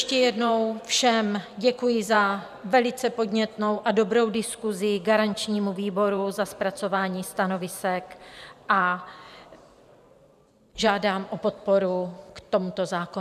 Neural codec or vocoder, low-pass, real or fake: vocoder, 44.1 kHz, 128 mel bands every 512 samples, BigVGAN v2; 14.4 kHz; fake